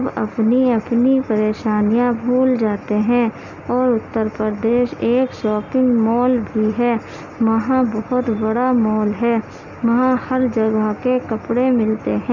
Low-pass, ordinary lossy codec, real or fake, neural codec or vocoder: 7.2 kHz; none; real; none